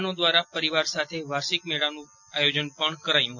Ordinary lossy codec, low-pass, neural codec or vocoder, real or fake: none; 7.2 kHz; none; real